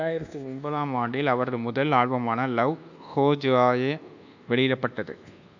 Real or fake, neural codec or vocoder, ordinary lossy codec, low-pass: fake; codec, 24 kHz, 1.2 kbps, DualCodec; none; 7.2 kHz